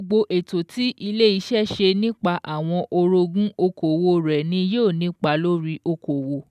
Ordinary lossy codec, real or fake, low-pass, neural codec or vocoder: none; real; 14.4 kHz; none